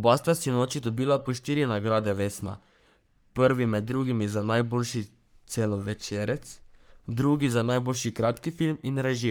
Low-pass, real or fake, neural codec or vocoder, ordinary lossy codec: none; fake; codec, 44.1 kHz, 3.4 kbps, Pupu-Codec; none